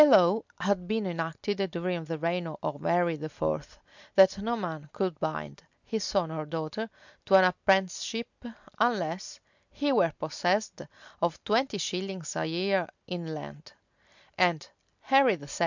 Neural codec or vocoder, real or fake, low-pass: none; real; 7.2 kHz